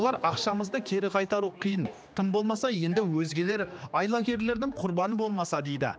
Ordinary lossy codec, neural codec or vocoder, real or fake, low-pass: none; codec, 16 kHz, 2 kbps, X-Codec, HuBERT features, trained on general audio; fake; none